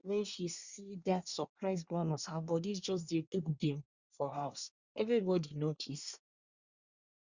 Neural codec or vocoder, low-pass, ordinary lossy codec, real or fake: codec, 24 kHz, 1 kbps, SNAC; 7.2 kHz; Opus, 64 kbps; fake